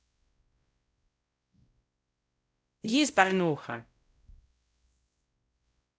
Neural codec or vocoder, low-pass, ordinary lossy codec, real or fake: codec, 16 kHz, 0.5 kbps, X-Codec, WavLM features, trained on Multilingual LibriSpeech; none; none; fake